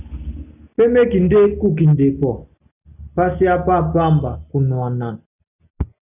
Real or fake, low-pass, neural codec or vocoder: real; 3.6 kHz; none